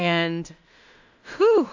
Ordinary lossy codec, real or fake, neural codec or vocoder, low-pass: AAC, 48 kbps; fake; codec, 16 kHz in and 24 kHz out, 0.4 kbps, LongCat-Audio-Codec, two codebook decoder; 7.2 kHz